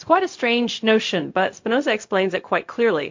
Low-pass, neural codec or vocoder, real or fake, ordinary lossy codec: 7.2 kHz; codec, 16 kHz, 0.4 kbps, LongCat-Audio-Codec; fake; MP3, 48 kbps